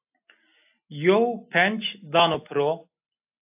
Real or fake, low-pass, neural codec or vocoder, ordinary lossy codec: real; 3.6 kHz; none; AAC, 32 kbps